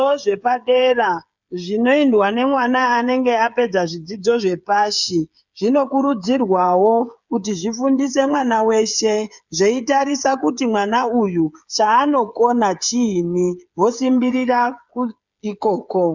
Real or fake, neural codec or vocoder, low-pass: fake; codec, 16 kHz, 8 kbps, FreqCodec, smaller model; 7.2 kHz